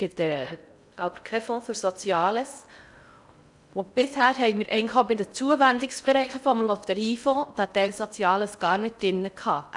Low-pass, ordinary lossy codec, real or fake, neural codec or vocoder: 10.8 kHz; none; fake; codec, 16 kHz in and 24 kHz out, 0.6 kbps, FocalCodec, streaming, 4096 codes